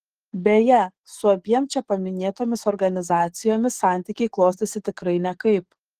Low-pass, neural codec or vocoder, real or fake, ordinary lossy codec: 14.4 kHz; codec, 44.1 kHz, 7.8 kbps, DAC; fake; Opus, 16 kbps